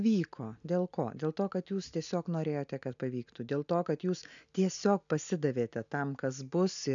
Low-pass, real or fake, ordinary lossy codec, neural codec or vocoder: 7.2 kHz; real; MP3, 96 kbps; none